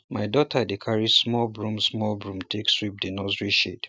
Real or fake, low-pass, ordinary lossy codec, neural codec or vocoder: real; none; none; none